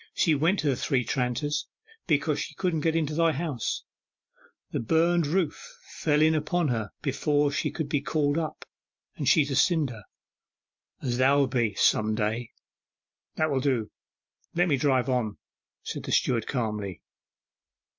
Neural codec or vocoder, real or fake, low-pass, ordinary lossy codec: none; real; 7.2 kHz; MP3, 48 kbps